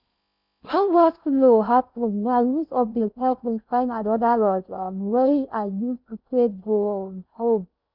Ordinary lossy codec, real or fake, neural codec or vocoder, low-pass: none; fake; codec, 16 kHz in and 24 kHz out, 0.6 kbps, FocalCodec, streaming, 4096 codes; 5.4 kHz